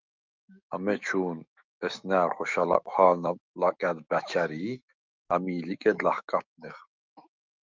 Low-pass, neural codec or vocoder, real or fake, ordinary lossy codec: 7.2 kHz; autoencoder, 48 kHz, 128 numbers a frame, DAC-VAE, trained on Japanese speech; fake; Opus, 32 kbps